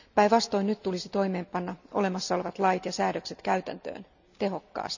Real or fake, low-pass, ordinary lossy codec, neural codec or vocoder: real; 7.2 kHz; none; none